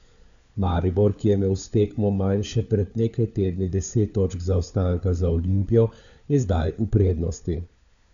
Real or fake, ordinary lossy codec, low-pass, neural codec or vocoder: fake; none; 7.2 kHz; codec, 16 kHz, 4 kbps, FunCodec, trained on LibriTTS, 50 frames a second